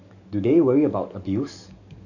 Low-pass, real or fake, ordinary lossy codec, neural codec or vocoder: 7.2 kHz; fake; none; codec, 44.1 kHz, 7.8 kbps, DAC